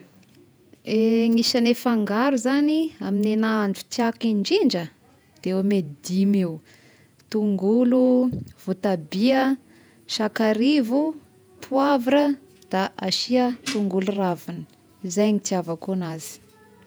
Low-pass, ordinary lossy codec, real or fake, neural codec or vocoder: none; none; fake; vocoder, 48 kHz, 128 mel bands, Vocos